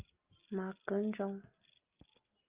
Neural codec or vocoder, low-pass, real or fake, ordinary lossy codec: none; 3.6 kHz; real; Opus, 24 kbps